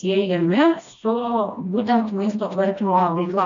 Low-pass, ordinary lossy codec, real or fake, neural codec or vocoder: 7.2 kHz; AAC, 64 kbps; fake; codec, 16 kHz, 1 kbps, FreqCodec, smaller model